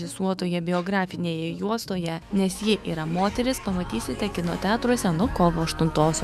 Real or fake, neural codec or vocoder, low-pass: fake; autoencoder, 48 kHz, 128 numbers a frame, DAC-VAE, trained on Japanese speech; 14.4 kHz